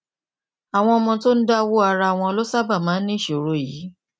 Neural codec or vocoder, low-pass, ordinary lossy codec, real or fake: none; none; none; real